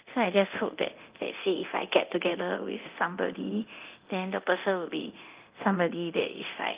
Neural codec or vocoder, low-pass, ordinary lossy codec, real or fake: codec, 24 kHz, 0.9 kbps, DualCodec; 3.6 kHz; Opus, 32 kbps; fake